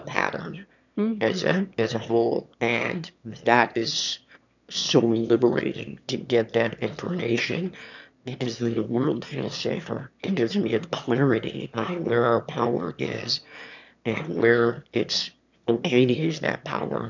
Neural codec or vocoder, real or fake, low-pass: autoencoder, 22.05 kHz, a latent of 192 numbers a frame, VITS, trained on one speaker; fake; 7.2 kHz